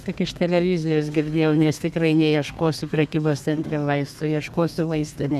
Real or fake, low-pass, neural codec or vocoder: fake; 14.4 kHz; codec, 32 kHz, 1.9 kbps, SNAC